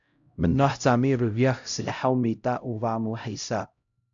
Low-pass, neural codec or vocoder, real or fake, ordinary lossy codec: 7.2 kHz; codec, 16 kHz, 0.5 kbps, X-Codec, HuBERT features, trained on LibriSpeech; fake; AAC, 64 kbps